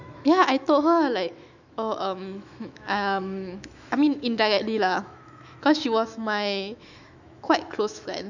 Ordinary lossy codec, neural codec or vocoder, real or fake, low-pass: none; autoencoder, 48 kHz, 128 numbers a frame, DAC-VAE, trained on Japanese speech; fake; 7.2 kHz